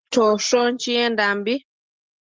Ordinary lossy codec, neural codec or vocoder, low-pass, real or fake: Opus, 16 kbps; none; 7.2 kHz; real